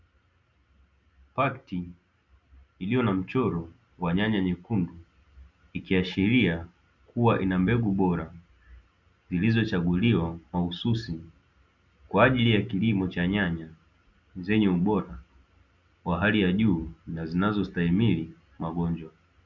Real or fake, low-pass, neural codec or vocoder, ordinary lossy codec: real; 7.2 kHz; none; Opus, 64 kbps